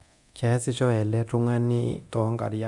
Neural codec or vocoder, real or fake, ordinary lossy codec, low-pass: codec, 24 kHz, 0.9 kbps, DualCodec; fake; none; 10.8 kHz